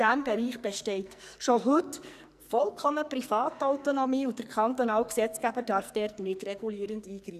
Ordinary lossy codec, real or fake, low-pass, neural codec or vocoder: none; fake; 14.4 kHz; codec, 44.1 kHz, 2.6 kbps, SNAC